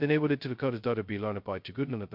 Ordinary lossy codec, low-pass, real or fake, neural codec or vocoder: MP3, 48 kbps; 5.4 kHz; fake; codec, 16 kHz, 0.2 kbps, FocalCodec